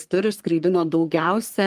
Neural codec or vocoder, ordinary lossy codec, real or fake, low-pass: codec, 44.1 kHz, 3.4 kbps, Pupu-Codec; Opus, 32 kbps; fake; 14.4 kHz